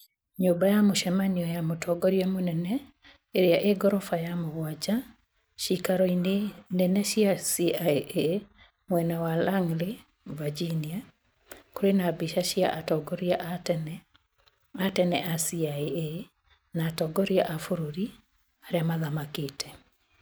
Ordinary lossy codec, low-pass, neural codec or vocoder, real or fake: none; none; none; real